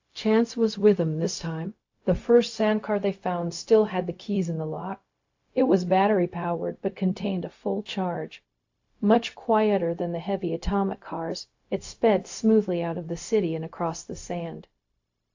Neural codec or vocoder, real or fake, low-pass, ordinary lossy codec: codec, 16 kHz, 0.4 kbps, LongCat-Audio-Codec; fake; 7.2 kHz; AAC, 48 kbps